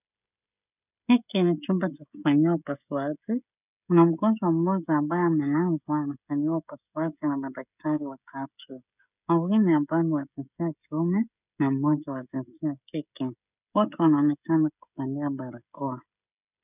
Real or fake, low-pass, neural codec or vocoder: fake; 3.6 kHz; codec, 16 kHz, 16 kbps, FreqCodec, smaller model